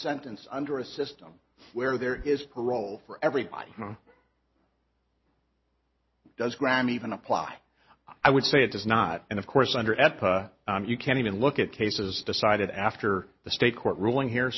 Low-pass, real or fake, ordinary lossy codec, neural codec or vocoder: 7.2 kHz; real; MP3, 24 kbps; none